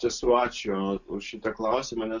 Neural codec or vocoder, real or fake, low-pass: none; real; 7.2 kHz